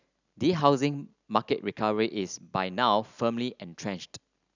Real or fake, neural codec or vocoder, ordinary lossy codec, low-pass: real; none; none; 7.2 kHz